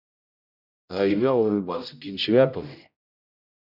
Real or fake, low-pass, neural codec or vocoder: fake; 5.4 kHz; codec, 16 kHz, 0.5 kbps, X-Codec, HuBERT features, trained on general audio